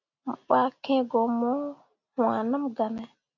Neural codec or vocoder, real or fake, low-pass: none; real; 7.2 kHz